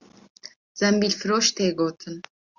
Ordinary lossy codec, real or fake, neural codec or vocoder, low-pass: Opus, 64 kbps; real; none; 7.2 kHz